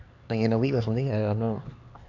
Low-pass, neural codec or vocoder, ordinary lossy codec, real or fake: 7.2 kHz; codec, 16 kHz, 4 kbps, X-Codec, HuBERT features, trained on LibriSpeech; AAC, 48 kbps; fake